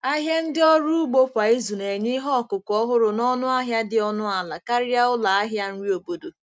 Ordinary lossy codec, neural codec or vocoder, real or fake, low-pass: none; none; real; none